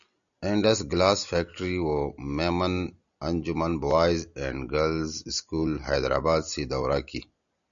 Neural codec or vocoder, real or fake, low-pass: none; real; 7.2 kHz